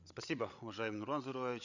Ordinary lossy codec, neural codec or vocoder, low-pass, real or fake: none; codec, 16 kHz, 8 kbps, FreqCodec, larger model; 7.2 kHz; fake